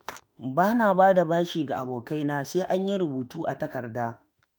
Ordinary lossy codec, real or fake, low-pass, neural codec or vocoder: none; fake; none; autoencoder, 48 kHz, 32 numbers a frame, DAC-VAE, trained on Japanese speech